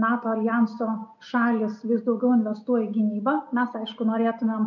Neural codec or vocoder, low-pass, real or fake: none; 7.2 kHz; real